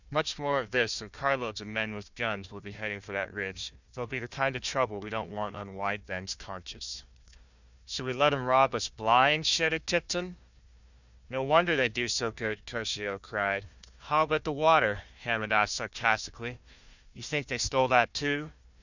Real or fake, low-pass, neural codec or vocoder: fake; 7.2 kHz; codec, 16 kHz, 1 kbps, FunCodec, trained on Chinese and English, 50 frames a second